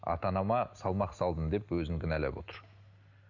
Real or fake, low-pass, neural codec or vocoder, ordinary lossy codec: real; 7.2 kHz; none; none